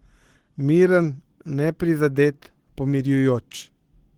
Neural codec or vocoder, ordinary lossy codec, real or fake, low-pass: codec, 44.1 kHz, 7.8 kbps, Pupu-Codec; Opus, 16 kbps; fake; 19.8 kHz